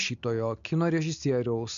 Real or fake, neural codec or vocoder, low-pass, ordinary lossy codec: real; none; 7.2 kHz; MP3, 64 kbps